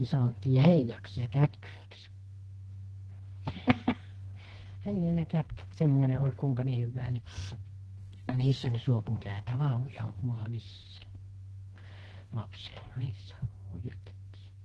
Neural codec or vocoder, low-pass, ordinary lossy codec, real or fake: codec, 24 kHz, 0.9 kbps, WavTokenizer, medium music audio release; 10.8 kHz; Opus, 16 kbps; fake